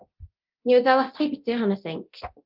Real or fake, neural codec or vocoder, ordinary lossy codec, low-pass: fake; codec, 24 kHz, 0.9 kbps, DualCodec; Opus, 24 kbps; 5.4 kHz